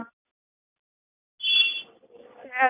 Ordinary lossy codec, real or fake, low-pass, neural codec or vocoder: none; real; 3.6 kHz; none